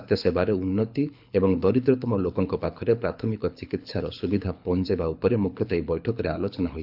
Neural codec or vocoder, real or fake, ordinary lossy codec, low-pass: codec, 24 kHz, 6 kbps, HILCodec; fake; none; 5.4 kHz